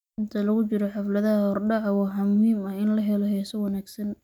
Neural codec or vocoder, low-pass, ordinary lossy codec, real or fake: none; 19.8 kHz; none; real